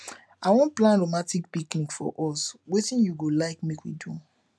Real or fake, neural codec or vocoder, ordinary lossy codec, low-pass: real; none; none; none